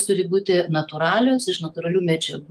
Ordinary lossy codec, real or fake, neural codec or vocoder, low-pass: Opus, 32 kbps; fake; autoencoder, 48 kHz, 128 numbers a frame, DAC-VAE, trained on Japanese speech; 14.4 kHz